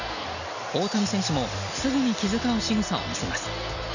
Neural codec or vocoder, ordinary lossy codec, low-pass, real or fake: none; none; 7.2 kHz; real